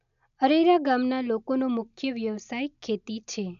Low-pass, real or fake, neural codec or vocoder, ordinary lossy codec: 7.2 kHz; real; none; none